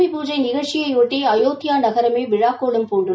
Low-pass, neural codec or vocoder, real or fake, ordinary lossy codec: none; none; real; none